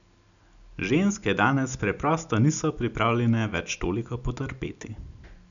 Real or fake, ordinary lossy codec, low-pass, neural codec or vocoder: real; none; 7.2 kHz; none